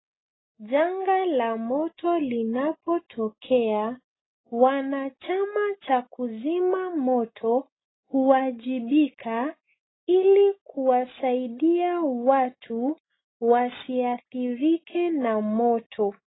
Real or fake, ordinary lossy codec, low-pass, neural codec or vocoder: real; AAC, 16 kbps; 7.2 kHz; none